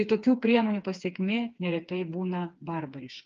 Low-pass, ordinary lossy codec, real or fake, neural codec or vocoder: 7.2 kHz; Opus, 24 kbps; fake; codec, 16 kHz, 4 kbps, FreqCodec, smaller model